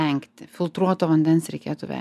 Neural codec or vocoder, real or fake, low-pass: none; real; 14.4 kHz